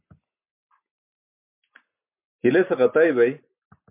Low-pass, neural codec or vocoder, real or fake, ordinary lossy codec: 3.6 kHz; none; real; MP3, 32 kbps